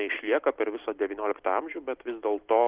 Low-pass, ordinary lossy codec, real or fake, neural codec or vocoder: 3.6 kHz; Opus, 16 kbps; real; none